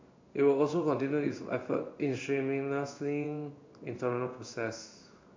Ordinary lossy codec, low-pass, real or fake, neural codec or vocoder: MP3, 64 kbps; 7.2 kHz; fake; codec, 16 kHz in and 24 kHz out, 1 kbps, XY-Tokenizer